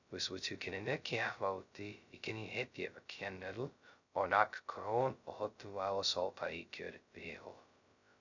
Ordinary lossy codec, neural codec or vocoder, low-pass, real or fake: none; codec, 16 kHz, 0.2 kbps, FocalCodec; 7.2 kHz; fake